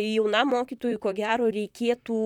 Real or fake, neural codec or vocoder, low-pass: fake; vocoder, 44.1 kHz, 128 mel bands, Pupu-Vocoder; 19.8 kHz